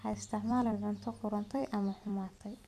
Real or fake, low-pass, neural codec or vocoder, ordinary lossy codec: fake; 14.4 kHz; vocoder, 44.1 kHz, 128 mel bands every 256 samples, BigVGAN v2; none